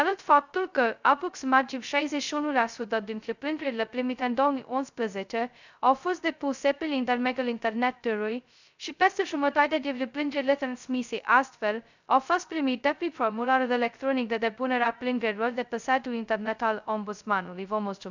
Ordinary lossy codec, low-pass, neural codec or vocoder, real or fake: none; 7.2 kHz; codec, 16 kHz, 0.2 kbps, FocalCodec; fake